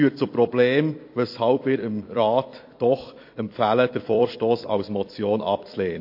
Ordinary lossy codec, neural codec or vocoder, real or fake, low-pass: MP3, 32 kbps; vocoder, 44.1 kHz, 128 mel bands every 256 samples, BigVGAN v2; fake; 5.4 kHz